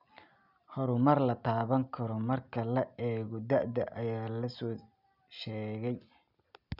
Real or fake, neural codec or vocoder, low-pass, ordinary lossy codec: real; none; 5.4 kHz; none